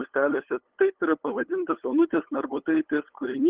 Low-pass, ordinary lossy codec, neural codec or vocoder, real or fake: 3.6 kHz; Opus, 16 kbps; codec, 16 kHz, 8 kbps, FunCodec, trained on LibriTTS, 25 frames a second; fake